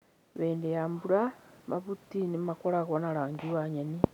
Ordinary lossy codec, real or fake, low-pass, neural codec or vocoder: none; real; 19.8 kHz; none